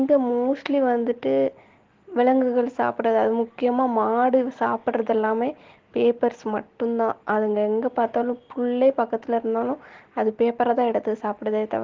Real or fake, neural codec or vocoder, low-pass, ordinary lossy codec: real; none; 7.2 kHz; Opus, 16 kbps